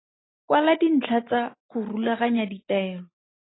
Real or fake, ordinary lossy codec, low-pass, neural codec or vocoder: real; AAC, 16 kbps; 7.2 kHz; none